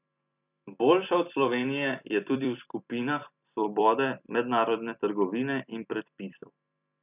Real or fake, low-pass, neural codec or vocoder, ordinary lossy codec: fake; 3.6 kHz; vocoder, 44.1 kHz, 128 mel bands every 256 samples, BigVGAN v2; none